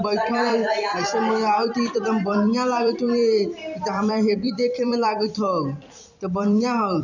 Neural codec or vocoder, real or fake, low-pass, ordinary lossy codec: vocoder, 44.1 kHz, 128 mel bands every 256 samples, BigVGAN v2; fake; 7.2 kHz; none